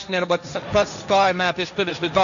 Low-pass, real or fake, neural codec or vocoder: 7.2 kHz; fake; codec, 16 kHz, 1.1 kbps, Voila-Tokenizer